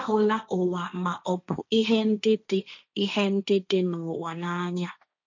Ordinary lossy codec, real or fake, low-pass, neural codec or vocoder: none; fake; 7.2 kHz; codec, 16 kHz, 1.1 kbps, Voila-Tokenizer